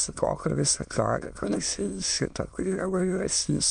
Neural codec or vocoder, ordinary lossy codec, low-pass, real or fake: autoencoder, 22.05 kHz, a latent of 192 numbers a frame, VITS, trained on many speakers; MP3, 96 kbps; 9.9 kHz; fake